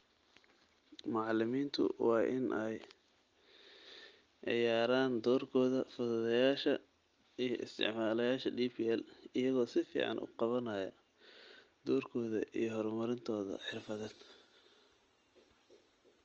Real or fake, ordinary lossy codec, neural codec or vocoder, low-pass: real; Opus, 24 kbps; none; 7.2 kHz